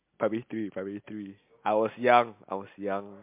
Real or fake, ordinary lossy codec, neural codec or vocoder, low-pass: real; MP3, 32 kbps; none; 3.6 kHz